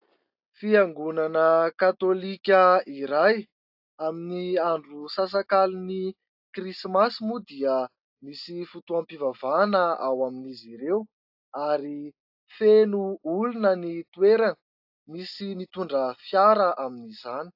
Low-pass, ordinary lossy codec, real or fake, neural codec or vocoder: 5.4 kHz; AAC, 48 kbps; real; none